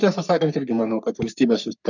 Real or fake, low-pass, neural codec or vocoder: fake; 7.2 kHz; codec, 44.1 kHz, 3.4 kbps, Pupu-Codec